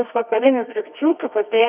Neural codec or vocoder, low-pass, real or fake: codec, 24 kHz, 0.9 kbps, WavTokenizer, medium music audio release; 3.6 kHz; fake